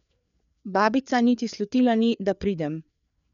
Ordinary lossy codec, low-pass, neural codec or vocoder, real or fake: MP3, 96 kbps; 7.2 kHz; codec, 16 kHz, 4 kbps, FreqCodec, larger model; fake